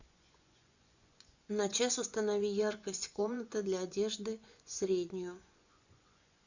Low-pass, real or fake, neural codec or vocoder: 7.2 kHz; fake; vocoder, 24 kHz, 100 mel bands, Vocos